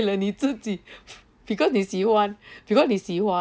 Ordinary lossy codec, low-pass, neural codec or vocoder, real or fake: none; none; none; real